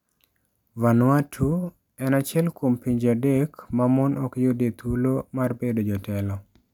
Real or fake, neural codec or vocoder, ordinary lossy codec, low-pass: real; none; none; 19.8 kHz